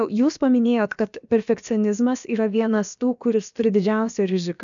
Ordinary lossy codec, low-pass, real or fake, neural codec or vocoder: MP3, 96 kbps; 7.2 kHz; fake; codec, 16 kHz, about 1 kbps, DyCAST, with the encoder's durations